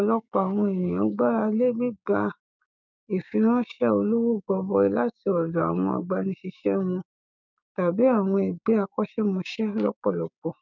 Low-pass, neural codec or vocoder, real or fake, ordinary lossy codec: 7.2 kHz; codec, 16 kHz, 6 kbps, DAC; fake; none